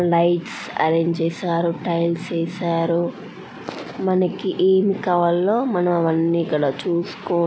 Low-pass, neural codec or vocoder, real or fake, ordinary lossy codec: none; none; real; none